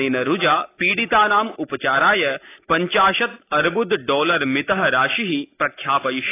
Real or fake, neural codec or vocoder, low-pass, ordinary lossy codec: real; none; 3.6 kHz; AAC, 24 kbps